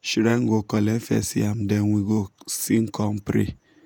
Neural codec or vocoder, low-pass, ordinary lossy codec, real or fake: vocoder, 48 kHz, 128 mel bands, Vocos; none; none; fake